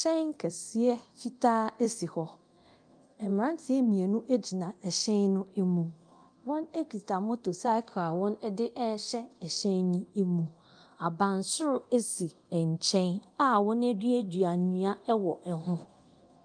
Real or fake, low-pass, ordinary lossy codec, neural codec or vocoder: fake; 9.9 kHz; Opus, 32 kbps; codec, 24 kHz, 0.9 kbps, DualCodec